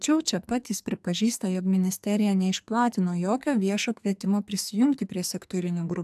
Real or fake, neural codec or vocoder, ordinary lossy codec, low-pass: fake; codec, 44.1 kHz, 2.6 kbps, SNAC; AAC, 96 kbps; 14.4 kHz